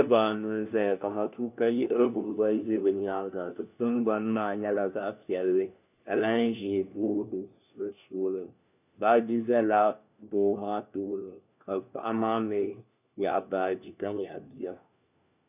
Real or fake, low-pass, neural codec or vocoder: fake; 3.6 kHz; codec, 16 kHz, 1 kbps, FunCodec, trained on LibriTTS, 50 frames a second